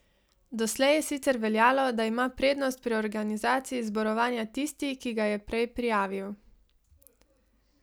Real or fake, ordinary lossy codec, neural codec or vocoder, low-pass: real; none; none; none